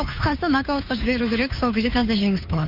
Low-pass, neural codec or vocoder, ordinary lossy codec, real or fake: 5.4 kHz; codec, 16 kHz, 2 kbps, FunCodec, trained on Chinese and English, 25 frames a second; none; fake